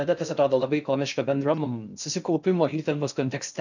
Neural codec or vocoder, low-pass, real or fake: codec, 16 kHz in and 24 kHz out, 0.6 kbps, FocalCodec, streaming, 2048 codes; 7.2 kHz; fake